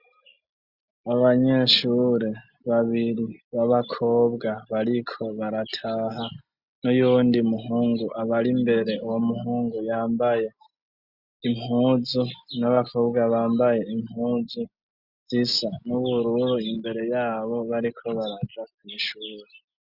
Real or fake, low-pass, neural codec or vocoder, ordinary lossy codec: real; 5.4 kHz; none; Opus, 64 kbps